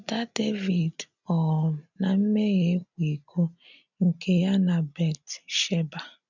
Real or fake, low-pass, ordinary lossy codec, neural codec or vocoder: real; 7.2 kHz; none; none